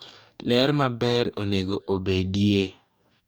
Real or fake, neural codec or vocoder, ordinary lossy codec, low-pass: fake; codec, 44.1 kHz, 2.6 kbps, DAC; none; none